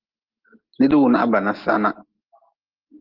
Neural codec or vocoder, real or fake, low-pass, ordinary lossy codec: none; real; 5.4 kHz; Opus, 16 kbps